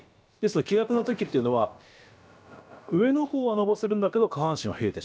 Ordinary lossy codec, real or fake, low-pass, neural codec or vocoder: none; fake; none; codec, 16 kHz, about 1 kbps, DyCAST, with the encoder's durations